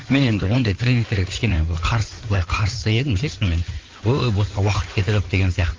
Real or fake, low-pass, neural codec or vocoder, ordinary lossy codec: fake; 7.2 kHz; codec, 16 kHz in and 24 kHz out, 2.2 kbps, FireRedTTS-2 codec; Opus, 32 kbps